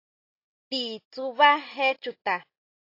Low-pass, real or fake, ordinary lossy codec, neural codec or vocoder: 5.4 kHz; real; AAC, 32 kbps; none